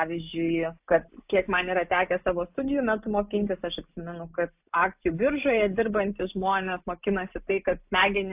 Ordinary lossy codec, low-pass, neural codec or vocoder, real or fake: AAC, 32 kbps; 3.6 kHz; none; real